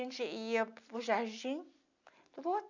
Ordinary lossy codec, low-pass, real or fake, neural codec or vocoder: none; 7.2 kHz; real; none